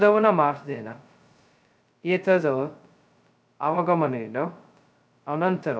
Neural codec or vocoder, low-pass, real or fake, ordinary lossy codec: codec, 16 kHz, 0.2 kbps, FocalCodec; none; fake; none